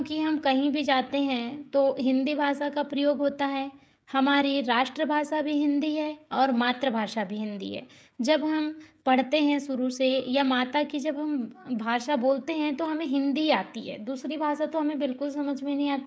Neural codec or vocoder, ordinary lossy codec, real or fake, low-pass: codec, 16 kHz, 16 kbps, FreqCodec, smaller model; none; fake; none